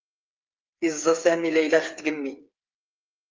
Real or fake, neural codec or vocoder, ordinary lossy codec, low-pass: fake; codec, 16 kHz, 8 kbps, FreqCodec, smaller model; Opus, 32 kbps; 7.2 kHz